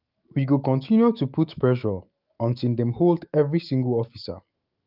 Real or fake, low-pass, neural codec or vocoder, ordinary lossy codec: fake; 5.4 kHz; autoencoder, 48 kHz, 128 numbers a frame, DAC-VAE, trained on Japanese speech; Opus, 24 kbps